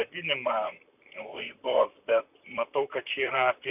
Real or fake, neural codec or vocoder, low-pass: fake; vocoder, 44.1 kHz, 128 mel bands, Pupu-Vocoder; 3.6 kHz